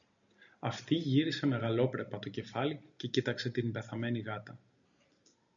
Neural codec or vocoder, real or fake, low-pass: none; real; 7.2 kHz